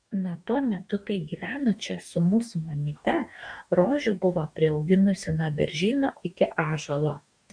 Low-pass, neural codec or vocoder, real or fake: 9.9 kHz; codec, 44.1 kHz, 2.6 kbps, DAC; fake